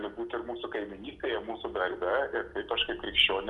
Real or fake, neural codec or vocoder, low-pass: real; none; 10.8 kHz